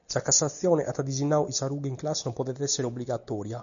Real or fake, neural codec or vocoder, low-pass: real; none; 7.2 kHz